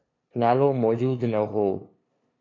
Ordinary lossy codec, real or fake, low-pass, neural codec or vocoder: AAC, 32 kbps; fake; 7.2 kHz; codec, 16 kHz, 2 kbps, FunCodec, trained on LibriTTS, 25 frames a second